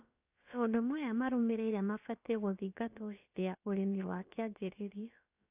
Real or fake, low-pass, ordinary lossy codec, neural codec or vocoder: fake; 3.6 kHz; AAC, 32 kbps; codec, 16 kHz, about 1 kbps, DyCAST, with the encoder's durations